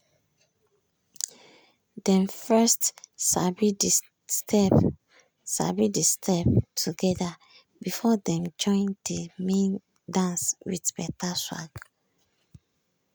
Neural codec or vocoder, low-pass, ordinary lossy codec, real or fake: none; none; none; real